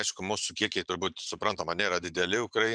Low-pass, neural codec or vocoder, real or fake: 9.9 kHz; vocoder, 44.1 kHz, 128 mel bands every 512 samples, BigVGAN v2; fake